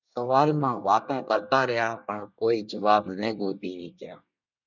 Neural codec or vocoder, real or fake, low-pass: codec, 24 kHz, 1 kbps, SNAC; fake; 7.2 kHz